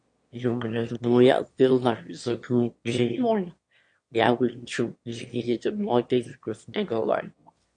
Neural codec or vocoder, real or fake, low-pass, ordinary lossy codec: autoencoder, 22.05 kHz, a latent of 192 numbers a frame, VITS, trained on one speaker; fake; 9.9 kHz; MP3, 48 kbps